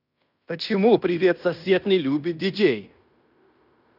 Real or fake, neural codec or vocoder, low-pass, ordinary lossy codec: fake; codec, 16 kHz in and 24 kHz out, 0.9 kbps, LongCat-Audio-Codec, fine tuned four codebook decoder; 5.4 kHz; none